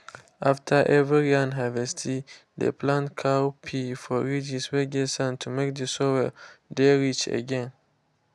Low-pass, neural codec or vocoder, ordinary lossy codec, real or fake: none; none; none; real